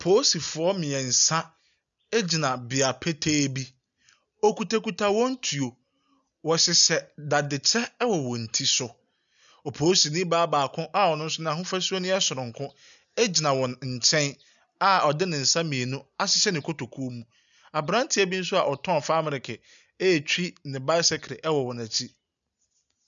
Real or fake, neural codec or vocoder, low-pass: real; none; 7.2 kHz